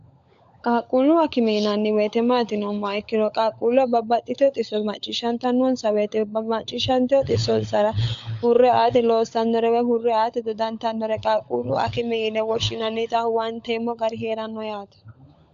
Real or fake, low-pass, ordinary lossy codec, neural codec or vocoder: fake; 7.2 kHz; AAC, 64 kbps; codec, 16 kHz, 16 kbps, FunCodec, trained on LibriTTS, 50 frames a second